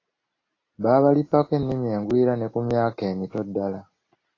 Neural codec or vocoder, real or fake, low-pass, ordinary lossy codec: none; real; 7.2 kHz; AAC, 32 kbps